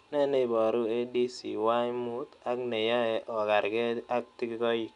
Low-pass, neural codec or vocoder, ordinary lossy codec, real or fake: 10.8 kHz; none; none; real